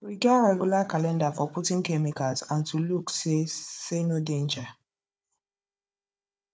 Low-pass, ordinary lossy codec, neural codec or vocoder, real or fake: none; none; codec, 16 kHz, 4 kbps, FunCodec, trained on Chinese and English, 50 frames a second; fake